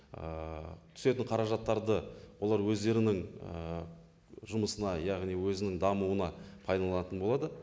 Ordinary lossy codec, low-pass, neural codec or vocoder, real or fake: none; none; none; real